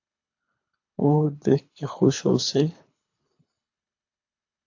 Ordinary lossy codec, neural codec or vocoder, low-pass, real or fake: AAC, 48 kbps; codec, 24 kHz, 3 kbps, HILCodec; 7.2 kHz; fake